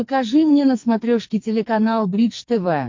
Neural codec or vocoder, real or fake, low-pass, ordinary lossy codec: codec, 44.1 kHz, 2.6 kbps, SNAC; fake; 7.2 kHz; MP3, 48 kbps